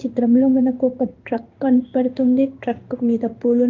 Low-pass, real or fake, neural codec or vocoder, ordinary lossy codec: 7.2 kHz; fake; codec, 16 kHz in and 24 kHz out, 1 kbps, XY-Tokenizer; Opus, 24 kbps